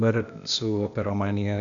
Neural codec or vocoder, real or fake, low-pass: codec, 16 kHz, 0.8 kbps, ZipCodec; fake; 7.2 kHz